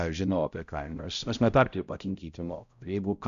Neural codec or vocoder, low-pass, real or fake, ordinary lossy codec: codec, 16 kHz, 0.5 kbps, X-Codec, HuBERT features, trained on balanced general audio; 7.2 kHz; fake; Opus, 64 kbps